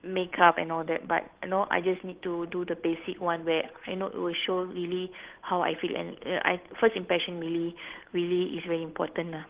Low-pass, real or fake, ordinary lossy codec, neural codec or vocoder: 3.6 kHz; fake; Opus, 16 kbps; codec, 16 kHz, 8 kbps, FunCodec, trained on Chinese and English, 25 frames a second